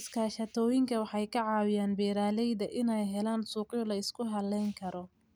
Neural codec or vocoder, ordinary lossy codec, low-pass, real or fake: none; none; none; real